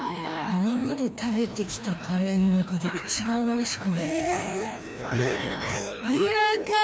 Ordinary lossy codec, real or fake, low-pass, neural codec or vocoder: none; fake; none; codec, 16 kHz, 1 kbps, FreqCodec, larger model